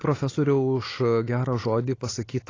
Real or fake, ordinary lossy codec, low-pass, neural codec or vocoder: real; AAC, 32 kbps; 7.2 kHz; none